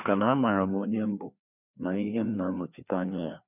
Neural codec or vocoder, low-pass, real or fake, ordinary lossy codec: codec, 16 kHz, 2 kbps, FreqCodec, larger model; 3.6 kHz; fake; none